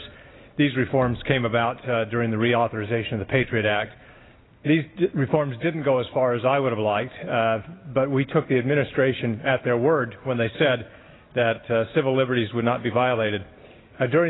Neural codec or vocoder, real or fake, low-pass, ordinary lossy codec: none; real; 7.2 kHz; AAC, 16 kbps